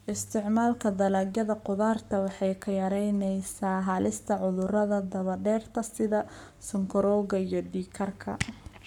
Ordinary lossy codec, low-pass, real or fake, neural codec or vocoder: none; 19.8 kHz; fake; codec, 44.1 kHz, 7.8 kbps, Pupu-Codec